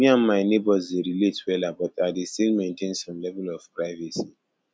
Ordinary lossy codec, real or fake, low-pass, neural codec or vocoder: none; real; none; none